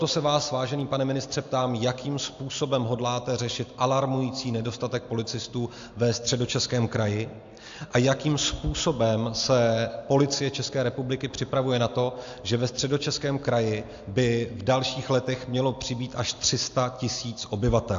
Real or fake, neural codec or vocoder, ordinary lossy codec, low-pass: real; none; AAC, 64 kbps; 7.2 kHz